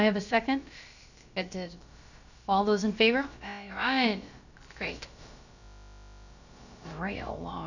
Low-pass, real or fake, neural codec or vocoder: 7.2 kHz; fake; codec, 16 kHz, about 1 kbps, DyCAST, with the encoder's durations